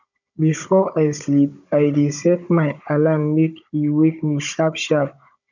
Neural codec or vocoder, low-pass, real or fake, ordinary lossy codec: codec, 16 kHz, 16 kbps, FunCodec, trained on Chinese and English, 50 frames a second; 7.2 kHz; fake; none